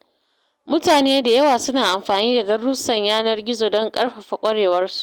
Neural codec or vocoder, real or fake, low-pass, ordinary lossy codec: none; real; 19.8 kHz; Opus, 32 kbps